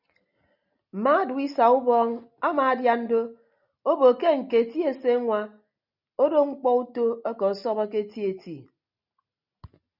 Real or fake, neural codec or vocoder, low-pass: real; none; 5.4 kHz